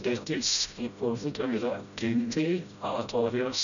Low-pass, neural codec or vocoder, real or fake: 7.2 kHz; codec, 16 kHz, 0.5 kbps, FreqCodec, smaller model; fake